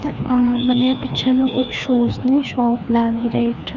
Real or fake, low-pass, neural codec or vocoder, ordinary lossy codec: fake; 7.2 kHz; codec, 16 kHz, 2 kbps, FreqCodec, larger model; none